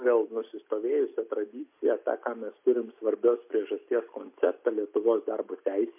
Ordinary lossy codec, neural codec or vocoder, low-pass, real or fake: MP3, 32 kbps; none; 3.6 kHz; real